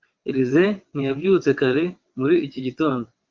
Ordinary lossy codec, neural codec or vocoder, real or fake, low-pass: Opus, 24 kbps; vocoder, 22.05 kHz, 80 mel bands, WaveNeXt; fake; 7.2 kHz